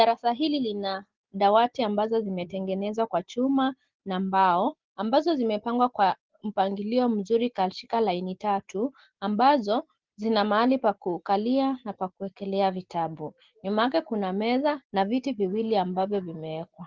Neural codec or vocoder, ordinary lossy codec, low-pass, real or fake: none; Opus, 16 kbps; 7.2 kHz; real